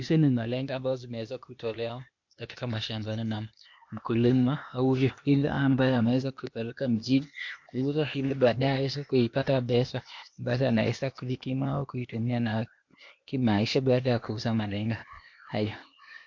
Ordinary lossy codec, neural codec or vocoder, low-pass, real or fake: MP3, 48 kbps; codec, 16 kHz, 0.8 kbps, ZipCodec; 7.2 kHz; fake